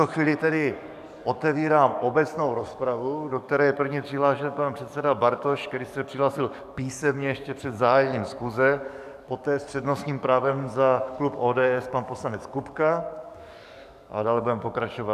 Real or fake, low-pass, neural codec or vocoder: fake; 14.4 kHz; codec, 44.1 kHz, 7.8 kbps, DAC